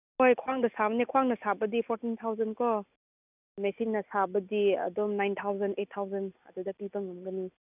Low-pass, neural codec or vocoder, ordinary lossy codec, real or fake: 3.6 kHz; none; none; real